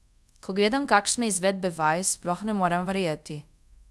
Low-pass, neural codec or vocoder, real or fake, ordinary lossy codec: none; codec, 24 kHz, 0.5 kbps, DualCodec; fake; none